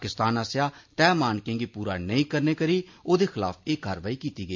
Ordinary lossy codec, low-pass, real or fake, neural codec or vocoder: MP3, 48 kbps; 7.2 kHz; real; none